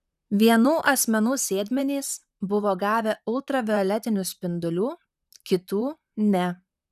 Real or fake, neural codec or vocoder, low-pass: fake; vocoder, 44.1 kHz, 128 mel bands, Pupu-Vocoder; 14.4 kHz